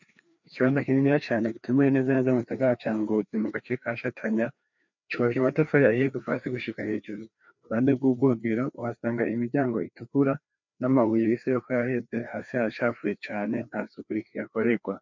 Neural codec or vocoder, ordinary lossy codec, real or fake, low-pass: codec, 16 kHz, 2 kbps, FreqCodec, larger model; MP3, 64 kbps; fake; 7.2 kHz